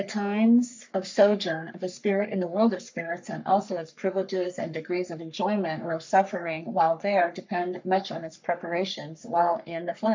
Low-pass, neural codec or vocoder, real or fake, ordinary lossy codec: 7.2 kHz; codec, 44.1 kHz, 3.4 kbps, Pupu-Codec; fake; MP3, 64 kbps